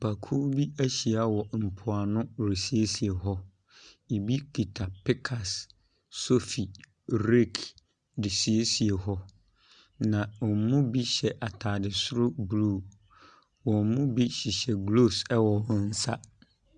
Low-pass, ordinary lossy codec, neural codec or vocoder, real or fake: 9.9 kHz; Opus, 64 kbps; none; real